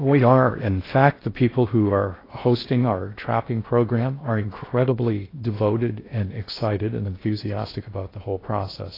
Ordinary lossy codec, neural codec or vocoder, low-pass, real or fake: AAC, 24 kbps; codec, 16 kHz in and 24 kHz out, 0.6 kbps, FocalCodec, streaming, 4096 codes; 5.4 kHz; fake